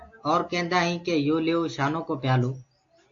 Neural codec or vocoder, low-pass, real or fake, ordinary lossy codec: none; 7.2 kHz; real; AAC, 48 kbps